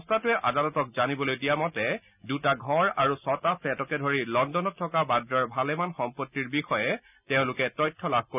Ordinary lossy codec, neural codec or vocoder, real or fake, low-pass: none; none; real; 3.6 kHz